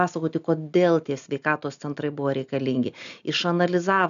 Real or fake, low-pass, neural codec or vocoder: real; 7.2 kHz; none